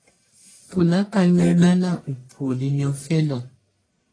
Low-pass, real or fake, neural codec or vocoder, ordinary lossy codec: 9.9 kHz; fake; codec, 44.1 kHz, 1.7 kbps, Pupu-Codec; AAC, 32 kbps